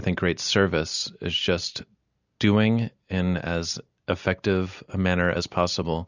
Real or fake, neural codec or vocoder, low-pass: real; none; 7.2 kHz